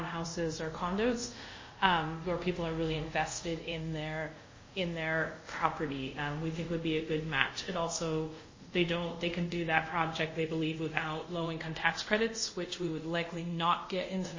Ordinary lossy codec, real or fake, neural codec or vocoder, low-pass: MP3, 32 kbps; fake; codec, 24 kHz, 0.5 kbps, DualCodec; 7.2 kHz